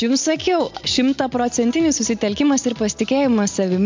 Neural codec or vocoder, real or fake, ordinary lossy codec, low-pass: none; real; MP3, 64 kbps; 7.2 kHz